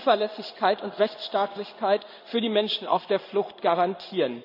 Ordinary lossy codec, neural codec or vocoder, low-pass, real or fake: none; codec, 16 kHz in and 24 kHz out, 1 kbps, XY-Tokenizer; 5.4 kHz; fake